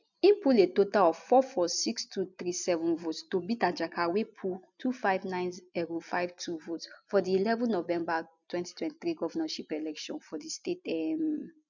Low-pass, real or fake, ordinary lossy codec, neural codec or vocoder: 7.2 kHz; real; none; none